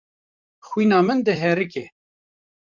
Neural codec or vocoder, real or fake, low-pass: vocoder, 44.1 kHz, 128 mel bands, Pupu-Vocoder; fake; 7.2 kHz